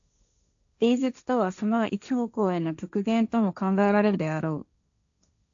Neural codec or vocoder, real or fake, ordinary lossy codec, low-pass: codec, 16 kHz, 1.1 kbps, Voila-Tokenizer; fake; AAC, 64 kbps; 7.2 kHz